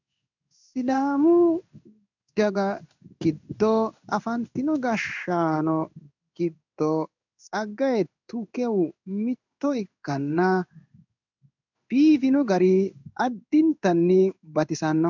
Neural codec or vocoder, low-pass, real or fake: codec, 16 kHz in and 24 kHz out, 1 kbps, XY-Tokenizer; 7.2 kHz; fake